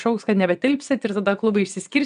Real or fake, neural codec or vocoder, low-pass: fake; vocoder, 22.05 kHz, 80 mel bands, WaveNeXt; 9.9 kHz